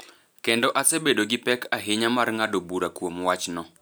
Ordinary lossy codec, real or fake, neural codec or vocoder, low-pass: none; real; none; none